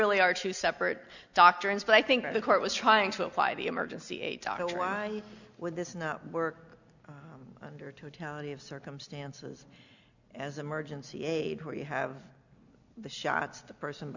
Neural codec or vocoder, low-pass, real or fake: none; 7.2 kHz; real